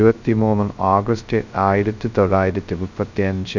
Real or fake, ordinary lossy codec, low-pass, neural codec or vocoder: fake; none; 7.2 kHz; codec, 16 kHz, 0.2 kbps, FocalCodec